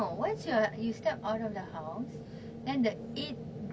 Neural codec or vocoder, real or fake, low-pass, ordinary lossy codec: none; real; none; none